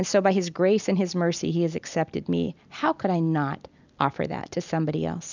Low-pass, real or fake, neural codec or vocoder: 7.2 kHz; real; none